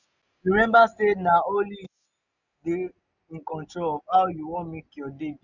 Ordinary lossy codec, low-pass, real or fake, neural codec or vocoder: none; 7.2 kHz; real; none